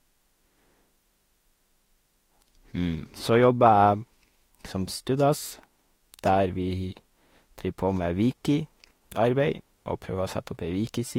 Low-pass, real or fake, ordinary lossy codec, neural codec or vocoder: 19.8 kHz; fake; AAC, 48 kbps; autoencoder, 48 kHz, 32 numbers a frame, DAC-VAE, trained on Japanese speech